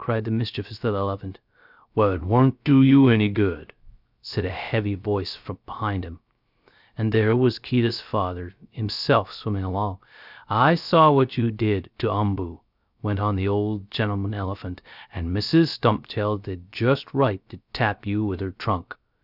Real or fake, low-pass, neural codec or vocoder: fake; 5.4 kHz; codec, 16 kHz, 0.3 kbps, FocalCodec